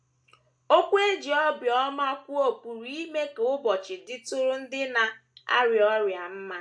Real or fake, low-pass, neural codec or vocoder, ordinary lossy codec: real; 9.9 kHz; none; AAC, 64 kbps